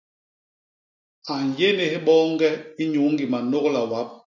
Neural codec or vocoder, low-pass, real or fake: none; 7.2 kHz; real